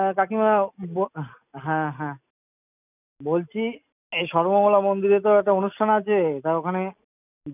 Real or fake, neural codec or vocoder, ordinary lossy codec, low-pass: real; none; none; 3.6 kHz